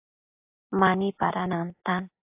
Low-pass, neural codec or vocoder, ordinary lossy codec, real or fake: 3.6 kHz; none; AAC, 32 kbps; real